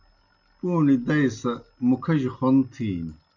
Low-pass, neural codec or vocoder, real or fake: 7.2 kHz; none; real